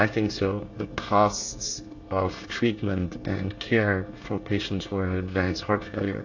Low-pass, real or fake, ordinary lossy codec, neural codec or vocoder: 7.2 kHz; fake; AAC, 48 kbps; codec, 24 kHz, 1 kbps, SNAC